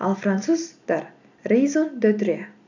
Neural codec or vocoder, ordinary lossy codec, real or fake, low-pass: none; none; real; 7.2 kHz